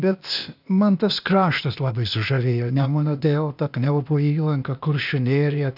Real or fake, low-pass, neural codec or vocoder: fake; 5.4 kHz; codec, 16 kHz, 0.8 kbps, ZipCodec